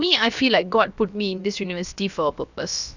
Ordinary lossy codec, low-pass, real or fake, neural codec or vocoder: none; 7.2 kHz; fake; codec, 16 kHz, about 1 kbps, DyCAST, with the encoder's durations